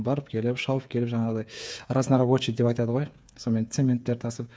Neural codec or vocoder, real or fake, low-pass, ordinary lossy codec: codec, 16 kHz, 8 kbps, FreqCodec, smaller model; fake; none; none